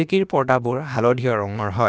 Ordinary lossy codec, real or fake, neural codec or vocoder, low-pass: none; fake; codec, 16 kHz, about 1 kbps, DyCAST, with the encoder's durations; none